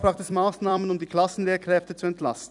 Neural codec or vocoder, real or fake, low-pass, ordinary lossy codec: vocoder, 44.1 kHz, 128 mel bands every 256 samples, BigVGAN v2; fake; 10.8 kHz; none